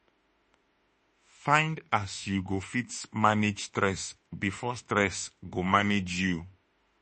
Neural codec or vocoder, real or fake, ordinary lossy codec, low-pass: autoencoder, 48 kHz, 32 numbers a frame, DAC-VAE, trained on Japanese speech; fake; MP3, 32 kbps; 10.8 kHz